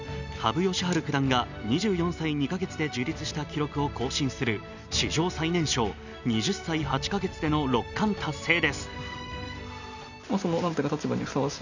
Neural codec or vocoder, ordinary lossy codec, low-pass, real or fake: none; none; 7.2 kHz; real